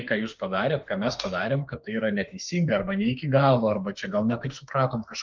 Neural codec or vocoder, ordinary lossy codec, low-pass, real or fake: autoencoder, 48 kHz, 32 numbers a frame, DAC-VAE, trained on Japanese speech; Opus, 32 kbps; 7.2 kHz; fake